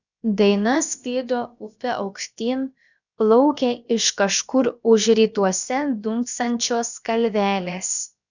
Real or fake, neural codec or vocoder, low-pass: fake; codec, 16 kHz, about 1 kbps, DyCAST, with the encoder's durations; 7.2 kHz